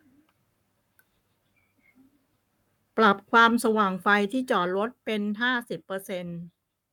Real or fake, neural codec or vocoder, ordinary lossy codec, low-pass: fake; codec, 44.1 kHz, 7.8 kbps, Pupu-Codec; none; 19.8 kHz